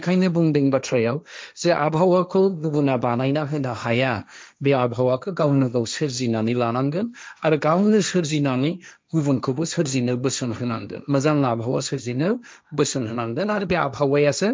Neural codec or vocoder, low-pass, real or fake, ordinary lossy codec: codec, 16 kHz, 1.1 kbps, Voila-Tokenizer; none; fake; none